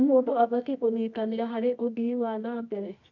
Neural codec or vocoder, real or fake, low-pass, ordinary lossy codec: codec, 24 kHz, 0.9 kbps, WavTokenizer, medium music audio release; fake; 7.2 kHz; none